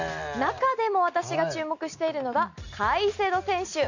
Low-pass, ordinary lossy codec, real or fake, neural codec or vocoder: 7.2 kHz; none; real; none